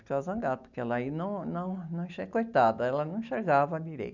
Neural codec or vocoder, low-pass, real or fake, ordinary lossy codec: none; 7.2 kHz; real; none